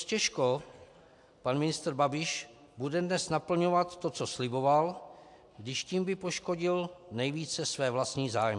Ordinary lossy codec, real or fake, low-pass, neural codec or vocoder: AAC, 64 kbps; real; 10.8 kHz; none